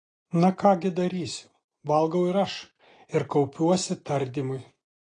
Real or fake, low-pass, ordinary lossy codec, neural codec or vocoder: real; 9.9 kHz; AAC, 32 kbps; none